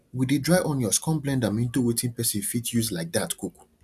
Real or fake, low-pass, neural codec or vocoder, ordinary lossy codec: real; 14.4 kHz; none; none